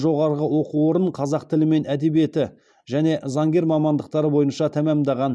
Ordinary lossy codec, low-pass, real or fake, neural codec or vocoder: none; none; real; none